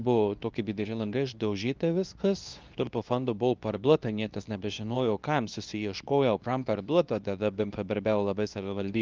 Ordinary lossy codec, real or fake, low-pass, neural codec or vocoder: Opus, 24 kbps; fake; 7.2 kHz; codec, 24 kHz, 0.9 kbps, WavTokenizer, medium speech release version 2